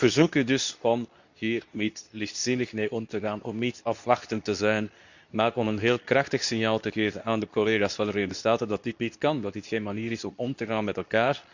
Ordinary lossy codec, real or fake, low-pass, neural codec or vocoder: none; fake; 7.2 kHz; codec, 24 kHz, 0.9 kbps, WavTokenizer, medium speech release version 2